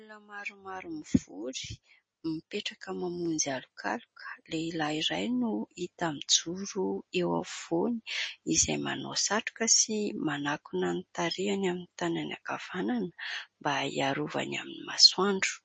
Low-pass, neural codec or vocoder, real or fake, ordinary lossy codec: 9.9 kHz; none; real; MP3, 32 kbps